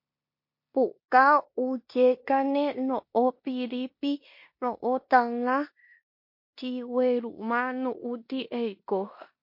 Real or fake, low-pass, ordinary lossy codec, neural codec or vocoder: fake; 5.4 kHz; MP3, 32 kbps; codec, 16 kHz in and 24 kHz out, 0.9 kbps, LongCat-Audio-Codec, four codebook decoder